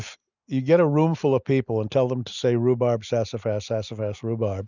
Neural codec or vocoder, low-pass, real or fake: none; 7.2 kHz; real